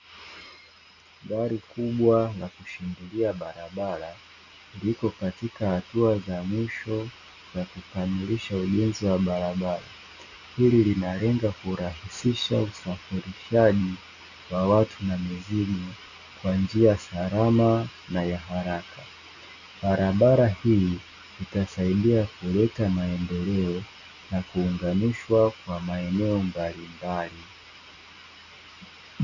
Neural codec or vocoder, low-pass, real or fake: none; 7.2 kHz; real